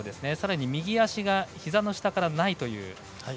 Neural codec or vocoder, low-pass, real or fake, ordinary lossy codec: none; none; real; none